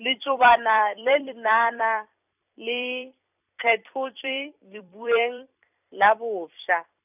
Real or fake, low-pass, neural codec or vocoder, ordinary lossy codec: fake; 3.6 kHz; vocoder, 44.1 kHz, 128 mel bands every 256 samples, BigVGAN v2; none